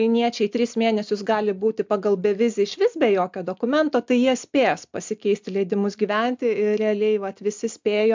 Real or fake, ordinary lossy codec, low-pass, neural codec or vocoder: real; MP3, 64 kbps; 7.2 kHz; none